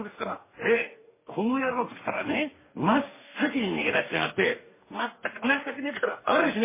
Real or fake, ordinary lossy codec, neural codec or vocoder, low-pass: fake; MP3, 16 kbps; codec, 44.1 kHz, 2.6 kbps, DAC; 3.6 kHz